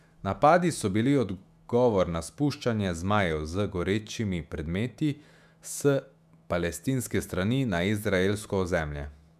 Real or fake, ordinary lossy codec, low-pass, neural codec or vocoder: fake; none; 14.4 kHz; autoencoder, 48 kHz, 128 numbers a frame, DAC-VAE, trained on Japanese speech